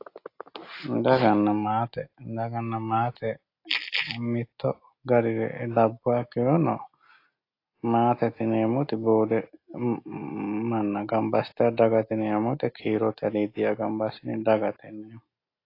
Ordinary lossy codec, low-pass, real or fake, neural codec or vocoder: AAC, 32 kbps; 5.4 kHz; real; none